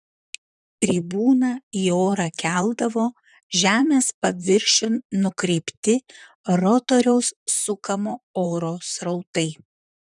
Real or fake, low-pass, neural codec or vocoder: fake; 10.8 kHz; vocoder, 44.1 kHz, 128 mel bands, Pupu-Vocoder